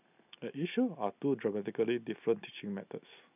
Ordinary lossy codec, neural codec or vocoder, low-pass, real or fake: none; none; 3.6 kHz; real